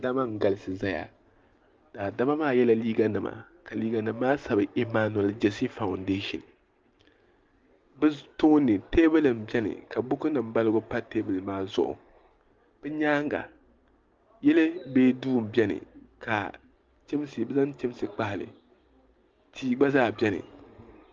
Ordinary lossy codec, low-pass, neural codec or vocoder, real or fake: Opus, 24 kbps; 7.2 kHz; none; real